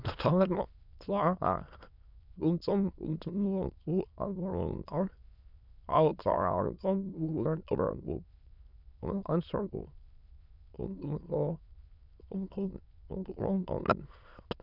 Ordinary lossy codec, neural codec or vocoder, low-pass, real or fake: none; autoencoder, 22.05 kHz, a latent of 192 numbers a frame, VITS, trained on many speakers; 5.4 kHz; fake